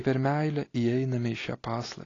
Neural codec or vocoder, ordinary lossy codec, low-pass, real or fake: none; AAC, 32 kbps; 7.2 kHz; real